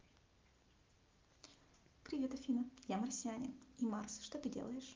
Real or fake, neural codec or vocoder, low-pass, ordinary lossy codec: real; none; 7.2 kHz; Opus, 32 kbps